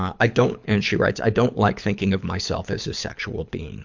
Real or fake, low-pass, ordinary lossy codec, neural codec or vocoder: fake; 7.2 kHz; MP3, 64 kbps; codec, 24 kHz, 6 kbps, HILCodec